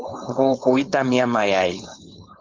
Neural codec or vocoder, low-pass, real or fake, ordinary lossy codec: codec, 16 kHz, 4.8 kbps, FACodec; 7.2 kHz; fake; Opus, 24 kbps